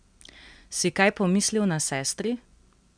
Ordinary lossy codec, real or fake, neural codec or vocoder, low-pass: none; real; none; 9.9 kHz